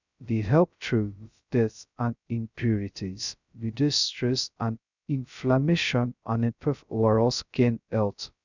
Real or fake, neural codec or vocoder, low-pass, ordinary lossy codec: fake; codec, 16 kHz, 0.2 kbps, FocalCodec; 7.2 kHz; none